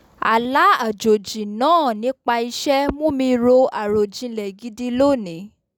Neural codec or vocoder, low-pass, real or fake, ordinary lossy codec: none; 19.8 kHz; real; none